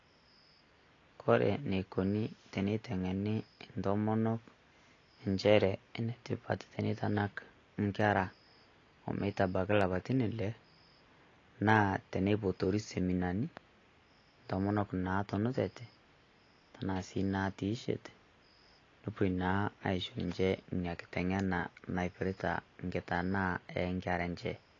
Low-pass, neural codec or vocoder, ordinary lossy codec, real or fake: 7.2 kHz; none; AAC, 32 kbps; real